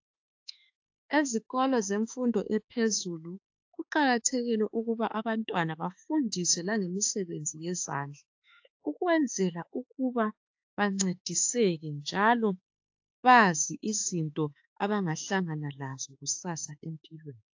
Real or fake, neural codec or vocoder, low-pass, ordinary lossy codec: fake; autoencoder, 48 kHz, 32 numbers a frame, DAC-VAE, trained on Japanese speech; 7.2 kHz; AAC, 48 kbps